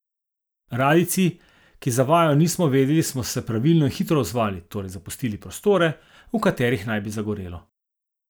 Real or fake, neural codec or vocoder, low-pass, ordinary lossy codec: real; none; none; none